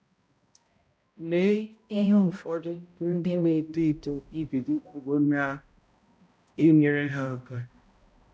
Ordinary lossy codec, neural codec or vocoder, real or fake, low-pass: none; codec, 16 kHz, 0.5 kbps, X-Codec, HuBERT features, trained on balanced general audio; fake; none